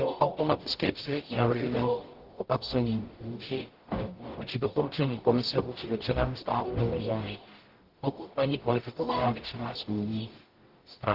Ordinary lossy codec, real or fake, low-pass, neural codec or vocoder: Opus, 16 kbps; fake; 5.4 kHz; codec, 44.1 kHz, 0.9 kbps, DAC